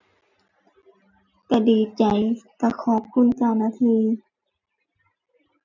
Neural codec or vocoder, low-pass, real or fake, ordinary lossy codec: none; 7.2 kHz; real; none